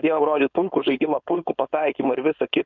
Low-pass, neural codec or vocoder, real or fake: 7.2 kHz; codec, 16 kHz, 4.8 kbps, FACodec; fake